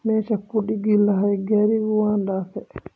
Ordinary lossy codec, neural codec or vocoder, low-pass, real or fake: none; none; none; real